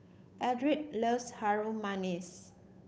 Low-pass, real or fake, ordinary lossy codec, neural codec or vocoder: none; fake; none; codec, 16 kHz, 8 kbps, FunCodec, trained on Chinese and English, 25 frames a second